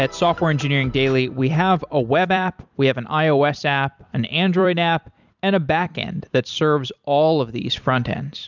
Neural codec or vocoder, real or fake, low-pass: vocoder, 44.1 kHz, 128 mel bands every 256 samples, BigVGAN v2; fake; 7.2 kHz